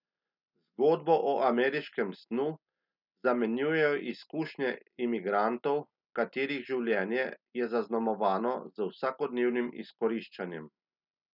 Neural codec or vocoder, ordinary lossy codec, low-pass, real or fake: none; none; 5.4 kHz; real